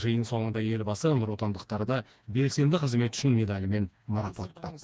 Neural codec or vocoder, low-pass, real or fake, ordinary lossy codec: codec, 16 kHz, 2 kbps, FreqCodec, smaller model; none; fake; none